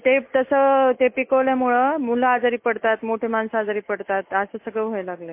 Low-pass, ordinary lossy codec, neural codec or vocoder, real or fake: 3.6 kHz; MP3, 24 kbps; none; real